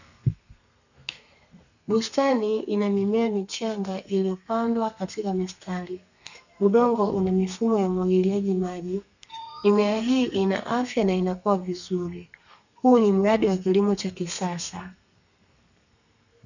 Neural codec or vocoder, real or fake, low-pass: codec, 32 kHz, 1.9 kbps, SNAC; fake; 7.2 kHz